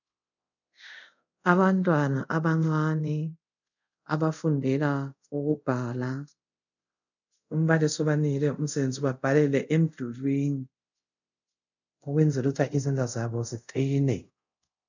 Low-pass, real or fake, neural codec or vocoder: 7.2 kHz; fake; codec, 24 kHz, 0.5 kbps, DualCodec